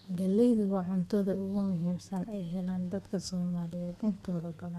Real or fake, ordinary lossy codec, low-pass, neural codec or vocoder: fake; none; 14.4 kHz; codec, 32 kHz, 1.9 kbps, SNAC